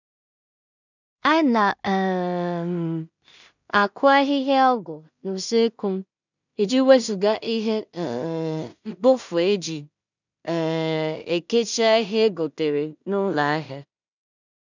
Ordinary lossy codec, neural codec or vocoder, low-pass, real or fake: none; codec, 16 kHz in and 24 kHz out, 0.4 kbps, LongCat-Audio-Codec, two codebook decoder; 7.2 kHz; fake